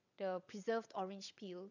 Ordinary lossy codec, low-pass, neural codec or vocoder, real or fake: none; 7.2 kHz; vocoder, 22.05 kHz, 80 mel bands, WaveNeXt; fake